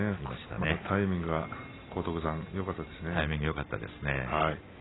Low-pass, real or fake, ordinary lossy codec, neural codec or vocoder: 7.2 kHz; real; AAC, 16 kbps; none